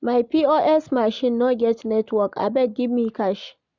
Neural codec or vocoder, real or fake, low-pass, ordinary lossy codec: none; real; 7.2 kHz; none